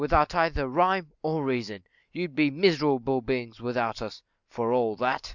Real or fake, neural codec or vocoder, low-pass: real; none; 7.2 kHz